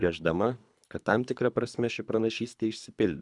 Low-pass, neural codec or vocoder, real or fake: 10.8 kHz; codec, 24 kHz, 3 kbps, HILCodec; fake